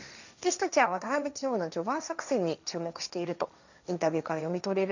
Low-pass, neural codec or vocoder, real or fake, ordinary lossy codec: 7.2 kHz; codec, 16 kHz, 1.1 kbps, Voila-Tokenizer; fake; none